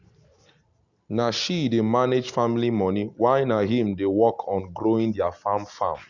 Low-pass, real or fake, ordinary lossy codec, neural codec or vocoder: 7.2 kHz; real; Opus, 64 kbps; none